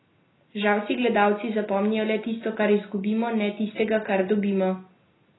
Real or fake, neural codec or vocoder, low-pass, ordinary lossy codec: real; none; 7.2 kHz; AAC, 16 kbps